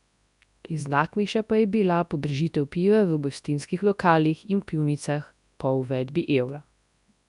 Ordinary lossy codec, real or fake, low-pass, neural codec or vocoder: none; fake; 10.8 kHz; codec, 24 kHz, 0.9 kbps, WavTokenizer, large speech release